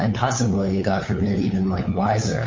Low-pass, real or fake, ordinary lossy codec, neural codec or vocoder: 7.2 kHz; fake; MP3, 32 kbps; codec, 16 kHz, 8 kbps, FunCodec, trained on LibriTTS, 25 frames a second